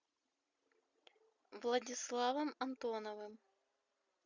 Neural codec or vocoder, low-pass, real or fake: none; 7.2 kHz; real